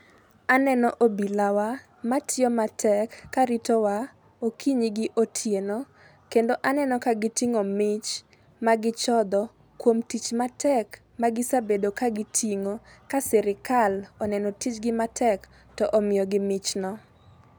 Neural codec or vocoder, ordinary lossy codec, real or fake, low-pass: none; none; real; none